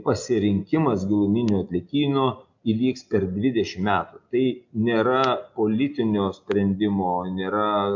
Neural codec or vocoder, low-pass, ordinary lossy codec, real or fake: none; 7.2 kHz; MP3, 64 kbps; real